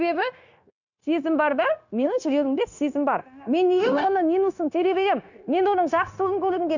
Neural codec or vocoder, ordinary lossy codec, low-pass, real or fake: codec, 16 kHz, 0.9 kbps, LongCat-Audio-Codec; none; 7.2 kHz; fake